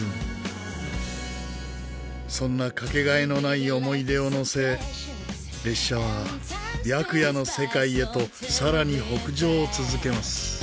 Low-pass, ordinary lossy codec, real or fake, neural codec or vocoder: none; none; real; none